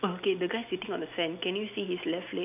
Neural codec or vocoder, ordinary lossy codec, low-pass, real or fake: none; none; 3.6 kHz; real